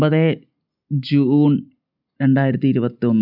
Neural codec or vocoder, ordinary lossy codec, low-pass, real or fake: none; none; 5.4 kHz; real